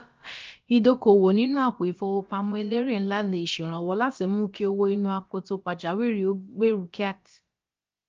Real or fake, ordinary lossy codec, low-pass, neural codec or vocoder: fake; Opus, 24 kbps; 7.2 kHz; codec, 16 kHz, about 1 kbps, DyCAST, with the encoder's durations